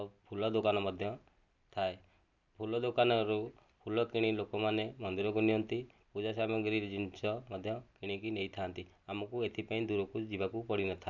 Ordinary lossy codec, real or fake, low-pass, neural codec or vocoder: none; real; 7.2 kHz; none